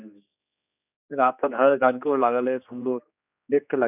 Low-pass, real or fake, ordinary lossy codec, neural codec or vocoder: 3.6 kHz; fake; none; codec, 16 kHz, 2 kbps, X-Codec, HuBERT features, trained on general audio